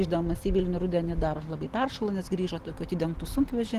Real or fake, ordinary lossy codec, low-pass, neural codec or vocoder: real; Opus, 16 kbps; 14.4 kHz; none